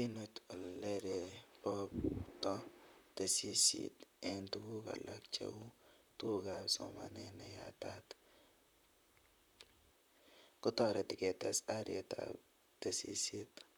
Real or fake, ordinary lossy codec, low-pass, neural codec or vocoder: fake; none; none; vocoder, 44.1 kHz, 128 mel bands, Pupu-Vocoder